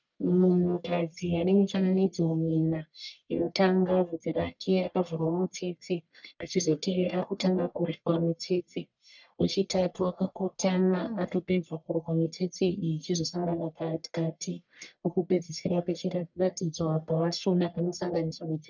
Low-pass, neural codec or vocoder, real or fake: 7.2 kHz; codec, 44.1 kHz, 1.7 kbps, Pupu-Codec; fake